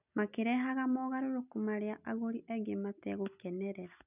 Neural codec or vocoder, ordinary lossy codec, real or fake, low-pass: none; none; real; 3.6 kHz